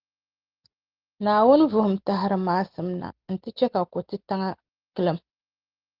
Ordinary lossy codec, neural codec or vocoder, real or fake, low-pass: Opus, 16 kbps; none; real; 5.4 kHz